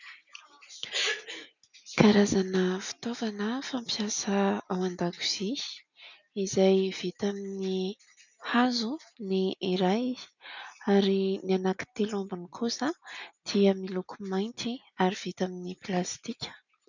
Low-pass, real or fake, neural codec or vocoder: 7.2 kHz; real; none